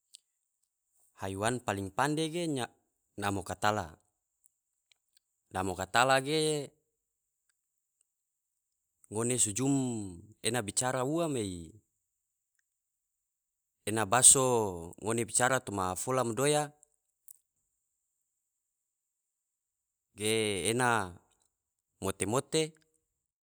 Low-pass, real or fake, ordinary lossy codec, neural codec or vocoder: none; real; none; none